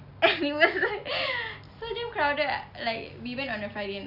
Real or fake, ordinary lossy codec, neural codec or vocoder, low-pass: real; Opus, 64 kbps; none; 5.4 kHz